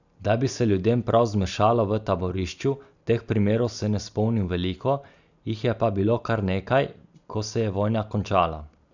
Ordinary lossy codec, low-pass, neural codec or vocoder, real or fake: none; 7.2 kHz; none; real